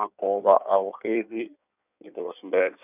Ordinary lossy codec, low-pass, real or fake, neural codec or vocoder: none; 3.6 kHz; fake; codec, 16 kHz in and 24 kHz out, 1.1 kbps, FireRedTTS-2 codec